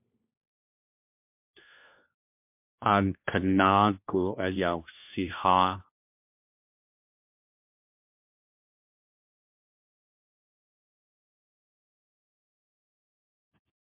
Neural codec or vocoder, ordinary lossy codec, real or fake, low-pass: codec, 16 kHz, 1 kbps, FunCodec, trained on LibriTTS, 50 frames a second; MP3, 32 kbps; fake; 3.6 kHz